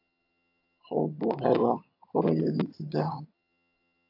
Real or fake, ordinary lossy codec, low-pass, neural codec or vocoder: fake; AAC, 32 kbps; 5.4 kHz; vocoder, 22.05 kHz, 80 mel bands, HiFi-GAN